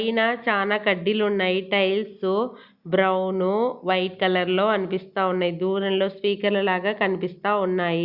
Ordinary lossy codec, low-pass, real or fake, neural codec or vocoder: Opus, 64 kbps; 5.4 kHz; real; none